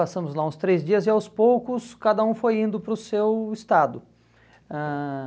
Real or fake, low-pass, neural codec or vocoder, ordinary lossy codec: real; none; none; none